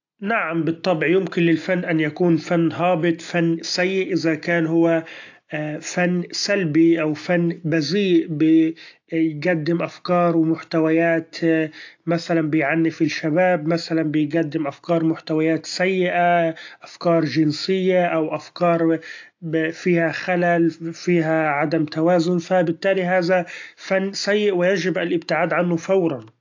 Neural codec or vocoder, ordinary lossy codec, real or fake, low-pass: none; AAC, 48 kbps; real; 7.2 kHz